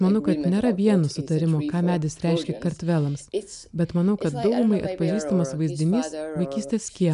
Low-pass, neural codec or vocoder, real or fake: 10.8 kHz; none; real